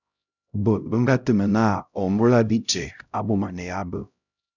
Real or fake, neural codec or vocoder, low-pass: fake; codec, 16 kHz, 0.5 kbps, X-Codec, HuBERT features, trained on LibriSpeech; 7.2 kHz